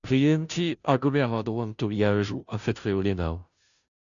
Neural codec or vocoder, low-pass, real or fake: codec, 16 kHz, 0.5 kbps, FunCodec, trained on Chinese and English, 25 frames a second; 7.2 kHz; fake